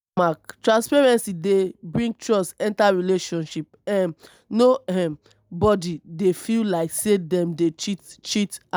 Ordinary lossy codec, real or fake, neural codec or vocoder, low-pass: none; real; none; none